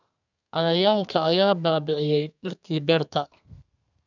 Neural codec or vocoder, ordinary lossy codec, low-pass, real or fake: codec, 32 kHz, 1.9 kbps, SNAC; none; 7.2 kHz; fake